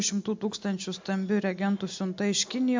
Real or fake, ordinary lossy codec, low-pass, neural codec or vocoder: real; MP3, 64 kbps; 7.2 kHz; none